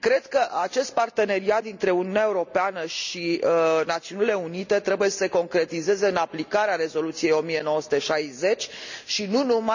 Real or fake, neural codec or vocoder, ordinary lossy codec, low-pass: real; none; none; 7.2 kHz